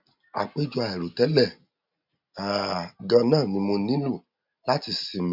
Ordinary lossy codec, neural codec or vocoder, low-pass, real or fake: none; none; 5.4 kHz; real